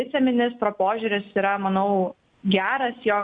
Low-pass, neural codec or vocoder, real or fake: 9.9 kHz; none; real